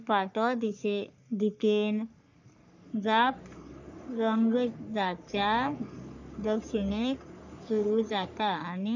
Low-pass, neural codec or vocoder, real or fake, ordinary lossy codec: 7.2 kHz; codec, 44.1 kHz, 3.4 kbps, Pupu-Codec; fake; none